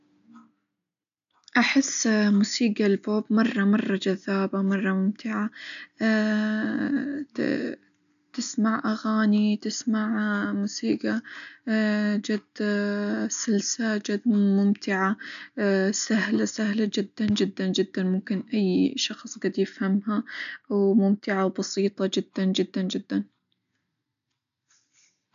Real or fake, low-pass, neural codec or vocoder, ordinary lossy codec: real; 7.2 kHz; none; none